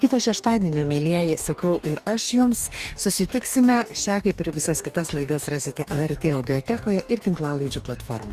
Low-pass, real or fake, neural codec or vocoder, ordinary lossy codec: 14.4 kHz; fake; codec, 44.1 kHz, 2.6 kbps, DAC; Opus, 64 kbps